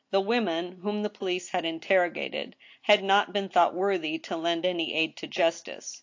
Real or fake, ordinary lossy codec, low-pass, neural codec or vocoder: real; AAC, 48 kbps; 7.2 kHz; none